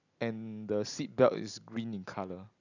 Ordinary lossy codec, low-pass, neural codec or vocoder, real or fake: none; 7.2 kHz; none; real